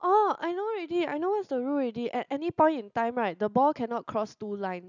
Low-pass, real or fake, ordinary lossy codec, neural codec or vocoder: 7.2 kHz; real; none; none